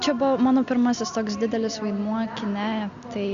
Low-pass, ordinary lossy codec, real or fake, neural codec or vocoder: 7.2 kHz; Opus, 64 kbps; real; none